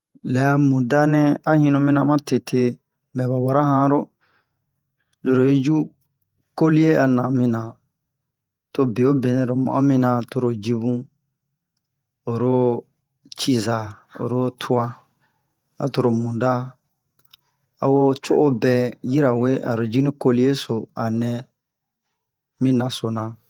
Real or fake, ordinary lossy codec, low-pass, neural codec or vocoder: fake; Opus, 32 kbps; 19.8 kHz; vocoder, 48 kHz, 128 mel bands, Vocos